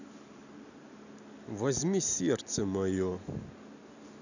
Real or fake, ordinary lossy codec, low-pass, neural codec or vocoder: real; none; 7.2 kHz; none